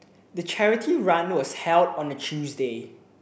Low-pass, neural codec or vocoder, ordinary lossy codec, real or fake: none; none; none; real